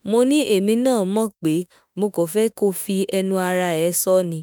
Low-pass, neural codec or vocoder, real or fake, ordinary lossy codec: none; autoencoder, 48 kHz, 32 numbers a frame, DAC-VAE, trained on Japanese speech; fake; none